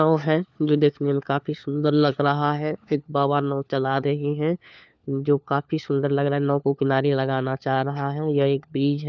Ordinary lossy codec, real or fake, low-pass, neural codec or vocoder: none; fake; none; codec, 16 kHz, 4 kbps, FunCodec, trained on LibriTTS, 50 frames a second